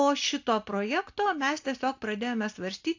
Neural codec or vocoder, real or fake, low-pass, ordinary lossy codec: none; real; 7.2 kHz; AAC, 48 kbps